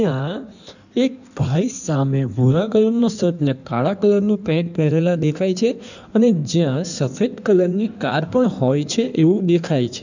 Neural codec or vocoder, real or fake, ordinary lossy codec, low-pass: codec, 16 kHz in and 24 kHz out, 1.1 kbps, FireRedTTS-2 codec; fake; none; 7.2 kHz